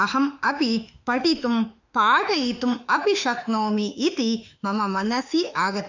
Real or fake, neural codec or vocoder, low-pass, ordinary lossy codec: fake; autoencoder, 48 kHz, 32 numbers a frame, DAC-VAE, trained on Japanese speech; 7.2 kHz; none